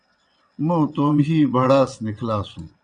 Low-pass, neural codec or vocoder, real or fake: 9.9 kHz; vocoder, 22.05 kHz, 80 mel bands, WaveNeXt; fake